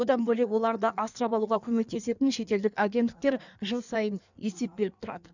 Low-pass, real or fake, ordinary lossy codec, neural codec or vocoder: 7.2 kHz; fake; none; codec, 16 kHz in and 24 kHz out, 1.1 kbps, FireRedTTS-2 codec